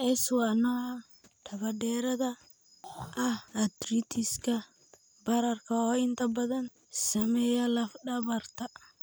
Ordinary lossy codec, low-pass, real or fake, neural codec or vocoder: none; none; real; none